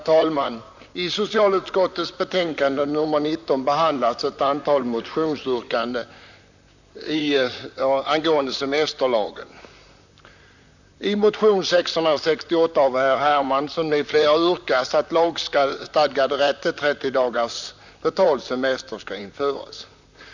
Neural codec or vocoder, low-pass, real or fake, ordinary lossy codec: vocoder, 44.1 kHz, 128 mel bands, Pupu-Vocoder; 7.2 kHz; fake; none